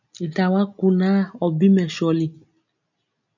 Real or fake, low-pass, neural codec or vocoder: real; 7.2 kHz; none